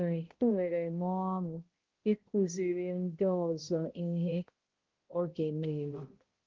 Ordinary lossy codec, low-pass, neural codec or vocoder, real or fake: Opus, 16 kbps; 7.2 kHz; codec, 16 kHz, 0.5 kbps, X-Codec, HuBERT features, trained on balanced general audio; fake